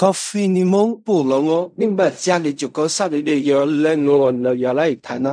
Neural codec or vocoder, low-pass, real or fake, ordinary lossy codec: codec, 16 kHz in and 24 kHz out, 0.4 kbps, LongCat-Audio-Codec, fine tuned four codebook decoder; 9.9 kHz; fake; none